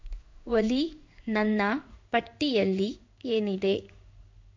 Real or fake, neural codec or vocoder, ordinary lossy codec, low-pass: fake; codec, 16 kHz in and 24 kHz out, 1 kbps, XY-Tokenizer; MP3, 64 kbps; 7.2 kHz